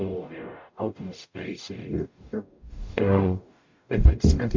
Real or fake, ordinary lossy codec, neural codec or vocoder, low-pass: fake; MP3, 48 kbps; codec, 44.1 kHz, 0.9 kbps, DAC; 7.2 kHz